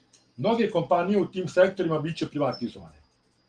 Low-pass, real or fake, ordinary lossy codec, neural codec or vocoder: 9.9 kHz; real; Opus, 24 kbps; none